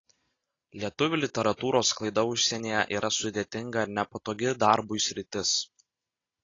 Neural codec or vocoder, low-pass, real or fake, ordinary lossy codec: none; 7.2 kHz; real; AAC, 32 kbps